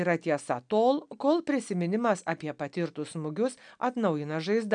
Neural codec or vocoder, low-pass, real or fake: none; 9.9 kHz; real